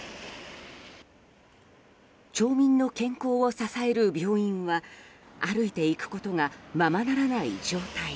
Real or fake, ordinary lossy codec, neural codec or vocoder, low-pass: real; none; none; none